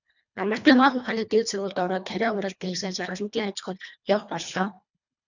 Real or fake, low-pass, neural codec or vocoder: fake; 7.2 kHz; codec, 24 kHz, 1.5 kbps, HILCodec